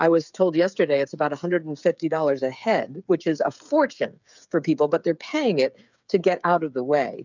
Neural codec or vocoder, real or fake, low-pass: codec, 16 kHz, 8 kbps, FreqCodec, smaller model; fake; 7.2 kHz